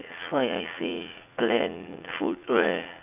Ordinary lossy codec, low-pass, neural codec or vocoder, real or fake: none; 3.6 kHz; vocoder, 44.1 kHz, 80 mel bands, Vocos; fake